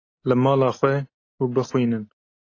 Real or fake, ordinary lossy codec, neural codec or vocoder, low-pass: real; AAC, 32 kbps; none; 7.2 kHz